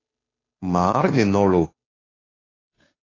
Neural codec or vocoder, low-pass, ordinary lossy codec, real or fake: codec, 16 kHz, 2 kbps, FunCodec, trained on Chinese and English, 25 frames a second; 7.2 kHz; AAC, 32 kbps; fake